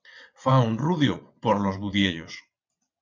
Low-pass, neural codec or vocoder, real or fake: 7.2 kHz; vocoder, 22.05 kHz, 80 mel bands, WaveNeXt; fake